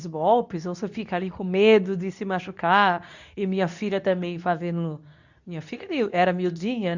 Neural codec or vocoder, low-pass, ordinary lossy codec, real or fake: codec, 24 kHz, 0.9 kbps, WavTokenizer, medium speech release version 2; 7.2 kHz; none; fake